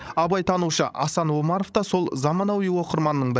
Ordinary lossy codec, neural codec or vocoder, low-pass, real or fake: none; codec, 16 kHz, 16 kbps, FreqCodec, larger model; none; fake